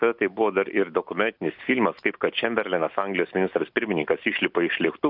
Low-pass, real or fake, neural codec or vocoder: 5.4 kHz; real; none